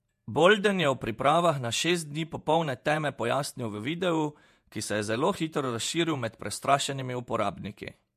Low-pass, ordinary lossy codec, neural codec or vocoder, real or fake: 14.4 kHz; MP3, 64 kbps; vocoder, 48 kHz, 128 mel bands, Vocos; fake